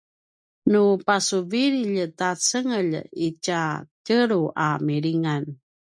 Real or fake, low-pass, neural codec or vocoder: real; 9.9 kHz; none